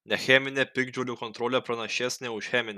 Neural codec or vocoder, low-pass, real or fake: none; 14.4 kHz; real